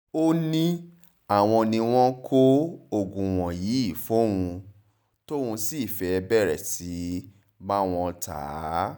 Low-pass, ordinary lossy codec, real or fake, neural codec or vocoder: none; none; real; none